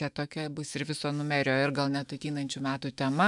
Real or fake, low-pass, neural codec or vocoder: fake; 10.8 kHz; autoencoder, 48 kHz, 128 numbers a frame, DAC-VAE, trained on Japanese speech